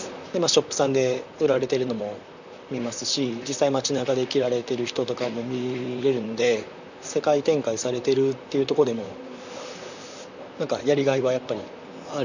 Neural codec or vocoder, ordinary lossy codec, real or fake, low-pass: vocoder, 44.1 kHz, 128 mel bands, Pupu-Vocoder; none; fake; 7.2 kHz